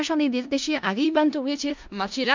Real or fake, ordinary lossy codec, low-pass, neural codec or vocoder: fake; MP3, 64 kbps; 7.2 kHz; codec, 16 kHz in and 24 kHz out, 0.4 kbps, LongCat-Audio-Codec, four codebook decoder